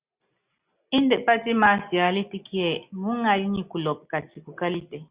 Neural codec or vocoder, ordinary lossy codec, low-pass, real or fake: none; Opus, 64 kbps; 3.6 kHz; real